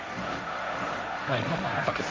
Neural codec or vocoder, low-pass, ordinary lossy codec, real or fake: codec, 16 kHz, 1.1 kbps, Voila-Tokenizer; none; none; fake